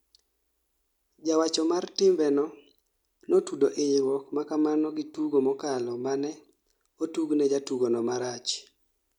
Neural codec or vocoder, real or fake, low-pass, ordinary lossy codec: none; real; 19.8 kHz; none